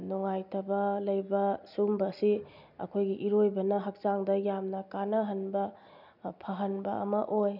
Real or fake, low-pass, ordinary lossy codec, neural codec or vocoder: real; 5.4 kHz; none; none